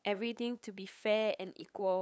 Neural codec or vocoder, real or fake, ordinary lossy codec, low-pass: codec, 16 kHz, 8 kbps, FunCodec, trained on LibriTTS, 25 frames a second; fake; none; none